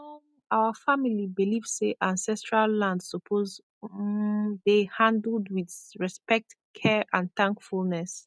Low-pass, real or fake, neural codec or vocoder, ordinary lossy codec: none; real; none; none